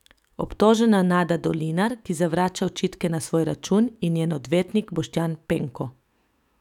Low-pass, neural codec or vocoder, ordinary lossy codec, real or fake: 19.8 kHz; autoencoder, 48 kHz, 128 numbers a frame, DAC-VAE, trained on Japanese speech; none; fake